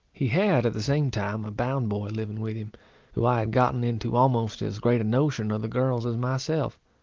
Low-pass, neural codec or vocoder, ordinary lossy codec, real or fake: 7.2 kHz; none; Opus, 32 kbps; real